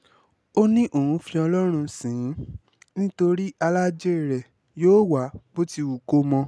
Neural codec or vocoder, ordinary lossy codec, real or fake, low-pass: none; none; real; none